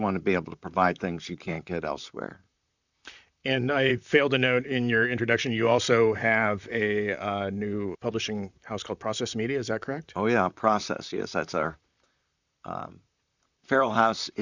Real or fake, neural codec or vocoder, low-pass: fake; vocoder, 44.1 kHz, 128 mel bands, Pupu-Vocoder; 7.2 kHz